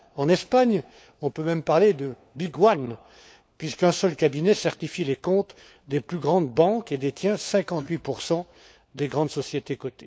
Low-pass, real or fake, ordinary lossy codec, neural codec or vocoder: none; fake; none; codec, 16 kHz, 4 kbps, FunCodec, trained on LibriTTS, 50 frames a second